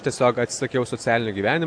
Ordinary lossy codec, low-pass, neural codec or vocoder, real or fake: AAC, 64 kbps; 9.9 kHz; none; real